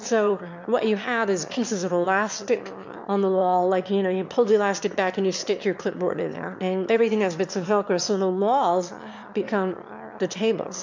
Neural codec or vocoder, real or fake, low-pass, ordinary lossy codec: autoencoder, 22.05 kHz, a latent of 192 numbers a frame, VITS, trained on one speaker; fake; 7.2 kHz; MP3, 64 kbps